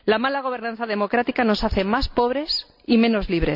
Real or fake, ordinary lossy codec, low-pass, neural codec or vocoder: real; none; 5.4 kHz; none